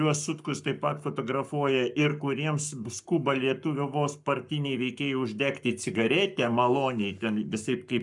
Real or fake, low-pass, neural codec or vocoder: fake; 10.8 kHz; codec, 44.1 kHz, 7.8 kbps, Pupu-Codec